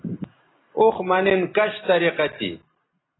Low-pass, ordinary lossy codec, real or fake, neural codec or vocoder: 7.2 kHz; AAC, 16 kbps; real; none